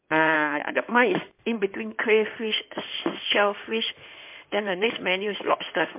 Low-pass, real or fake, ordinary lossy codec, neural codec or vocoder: 3.6 kHz; fake; MP3, 32 kbps; codec, 16 kHz in and 24 kHz out, 2.2 kbps, FireRedTTS-2 codec